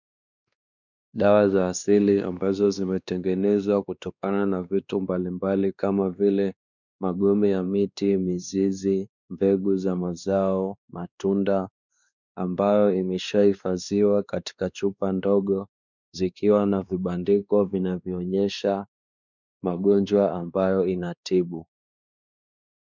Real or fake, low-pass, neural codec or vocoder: fake; 7.2 kHz; codec, 16 kHz, 2 kbps, X-Codec, WavLM features, trained on Multilingual LibriSpeech